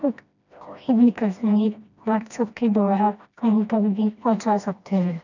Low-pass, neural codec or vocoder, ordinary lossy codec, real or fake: 7.2 kHz; codec, 16 kHz, 1 kbps, FreqCodec, smaller model; none; fake